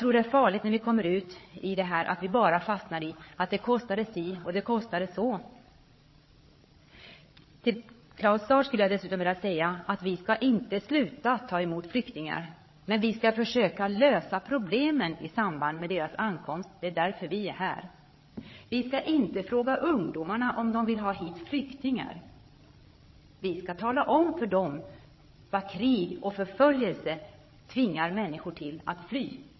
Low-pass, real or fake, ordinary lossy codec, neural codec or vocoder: 7.2 kHz; fake; MP3, 24 kbps; codec, 16 kHz, 8 kbps, FreqCodec, larger model